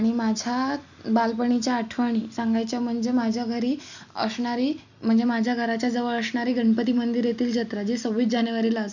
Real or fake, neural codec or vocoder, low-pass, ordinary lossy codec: real; none; 7.2 kHz; none